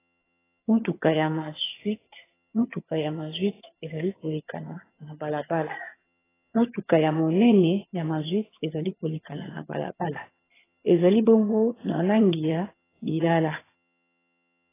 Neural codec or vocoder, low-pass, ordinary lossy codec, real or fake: vocoder, 22.05 kHz, 80 mel bands, HiFi-GAN; 3.6 kHz; AAC, 16 kbps; fake